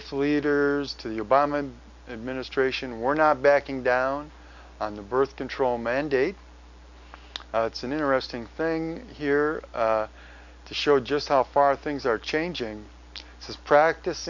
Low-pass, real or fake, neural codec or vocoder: 7.2 kHz; real; none